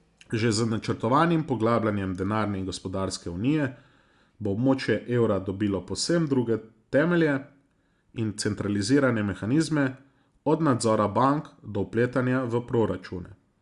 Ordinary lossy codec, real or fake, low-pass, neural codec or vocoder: Opus, 64 kbps; real; 10.8 kHz; none